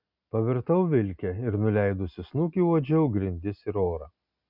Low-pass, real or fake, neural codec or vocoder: 5.4 kHz; real; none